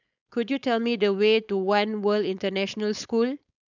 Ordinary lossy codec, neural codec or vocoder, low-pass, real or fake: none; codec, 16 kHz, 4.8 kbps, FACodec; 7.2 kHz; fake